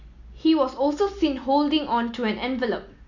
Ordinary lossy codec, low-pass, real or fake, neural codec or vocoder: none; 7.2 kHz; real; none